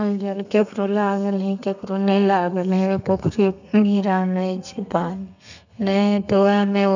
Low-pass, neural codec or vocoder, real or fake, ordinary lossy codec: 7.2 kHz; codec, 32 kHz, 1.9 kbps, SNAC; fake; none